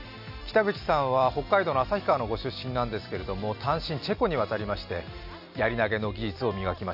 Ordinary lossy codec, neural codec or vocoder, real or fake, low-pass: none; none; real; 5.4 kHz